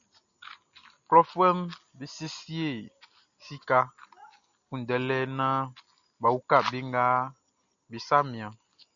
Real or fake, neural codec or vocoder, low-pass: real; none; 7.2 kHz